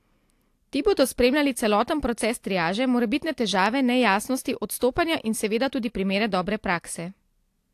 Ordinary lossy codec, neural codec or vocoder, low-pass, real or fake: AAC, 64 kbps; none; 14.4 kHz; real